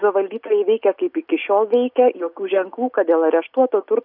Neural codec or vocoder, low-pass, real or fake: none; 5.4 kHz; real